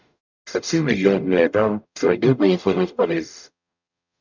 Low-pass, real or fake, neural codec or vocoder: 7.2 kHz; fake; codec, 44.1 kHz, 0.9 kbps, DAC